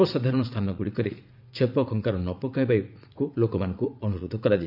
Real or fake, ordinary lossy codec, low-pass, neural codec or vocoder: fake; none; 5.4 kHz; vocoder, 44.1 kHz, 80 mel bands, Vocos